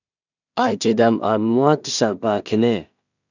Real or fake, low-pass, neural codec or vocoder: fake; 7.2 kHz; codec, 16 kHz in and 24 kHz out, 0.4 kbps, LongCat-Audio-Codec, two codebook decoder